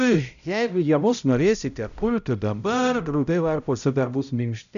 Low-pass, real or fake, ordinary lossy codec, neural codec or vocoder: 7.2 kHz; fake; MP3, 96 kbps; codec, 16 kHz, 0.5 kbps, X-Codec, HuBERT features, trained on balanced general audio